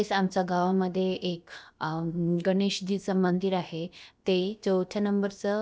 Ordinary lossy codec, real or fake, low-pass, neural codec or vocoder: none; fake; none; codec, 16 kHz, about 1 kbps, DyCAST, with the encoder's durations